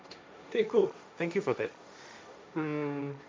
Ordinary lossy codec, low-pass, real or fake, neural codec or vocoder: none; none; fake; codec, 16 kHz, 1.1 kbps, Voila-Tokenizer